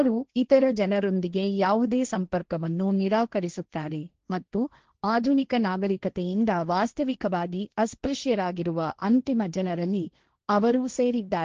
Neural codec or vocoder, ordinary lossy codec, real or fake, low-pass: codec, 16 kHz, 1.1 kbps, Voila-Tokenizer; Opus, 16 kbps; fake; 7.2 kHz